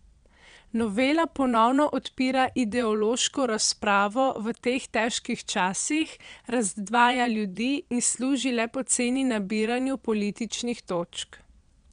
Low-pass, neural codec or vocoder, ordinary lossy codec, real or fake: 9.9 kHz; vocoder, 22.05 kHz, 80 mel bands, Vocos; MP3, 96 kbps; fake